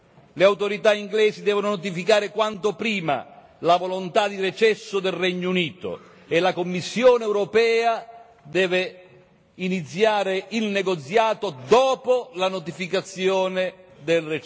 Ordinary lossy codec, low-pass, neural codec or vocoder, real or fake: none; none; none; real